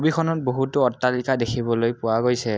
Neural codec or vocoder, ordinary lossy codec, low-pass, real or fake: none; none; none; real